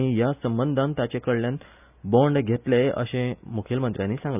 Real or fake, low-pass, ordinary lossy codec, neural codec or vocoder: real; 3.6 kHz; none; none